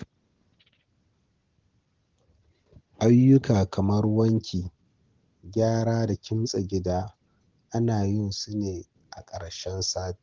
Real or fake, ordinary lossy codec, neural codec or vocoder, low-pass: real; Opus, 16 kbps; none; 7.2 kHz